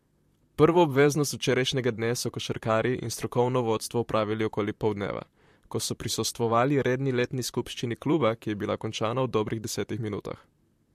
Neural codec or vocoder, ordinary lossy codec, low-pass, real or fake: vocoder, 44.1 kHz, 128 mel bands, Pupu-Vocoder; MP3, 64 kbps; 14.4 kHz; fake